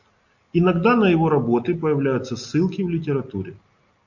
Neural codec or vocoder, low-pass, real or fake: none; 7.2 kHz; real